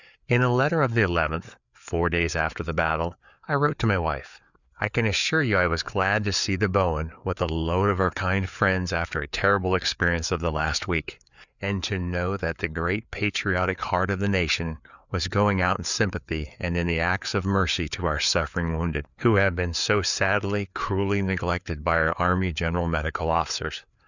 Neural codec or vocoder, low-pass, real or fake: codec, 16 kHz, 4 kbps, FreqCodec, larger model; 7.2 kHz; fake